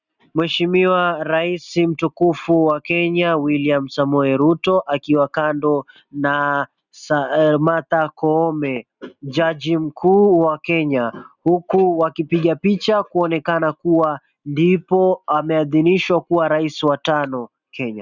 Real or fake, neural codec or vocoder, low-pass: real; none; 7.2 kHz